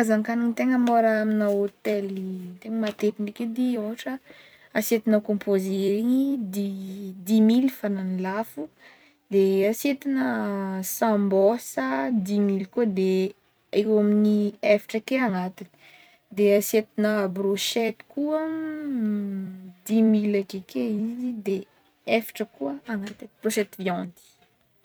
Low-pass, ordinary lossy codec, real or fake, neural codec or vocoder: none; none; fake; vocoder, 44.1 kHz, 128 mel bands every 256 samples, BigVGAN v2